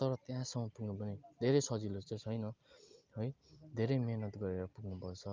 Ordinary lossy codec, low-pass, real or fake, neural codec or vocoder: Opus, 24 kbps; 7.2 kHz; real; none